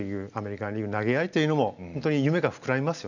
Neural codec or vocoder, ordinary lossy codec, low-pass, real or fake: none; none; 7.2 kHz; real